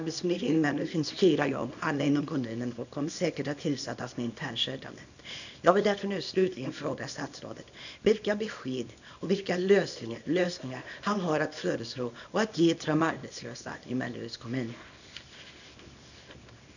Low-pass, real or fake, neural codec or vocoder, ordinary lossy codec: 7.2 kHz; fake; codec, 24 kHz, 0.9 kbps, WavTokenizer, small release; none